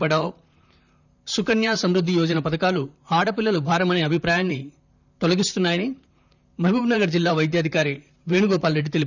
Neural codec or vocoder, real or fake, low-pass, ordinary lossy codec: vocoder, 44.1 kHz, 128 mel bands, Pupu-Vocoder; fake; 7.2 kHz; none